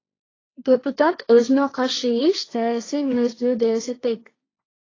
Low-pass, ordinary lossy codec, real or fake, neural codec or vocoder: 7.2 kHz; AAC, 32 kbps; fake; codec, 16 kHz, 1.1 kbps, Voila-Tokenizer